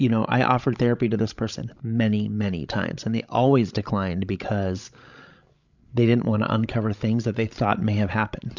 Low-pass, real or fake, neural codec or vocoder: 7.2 kHz; fake; codec, 16 kHz, 16 kbps, FreqCodec, larger model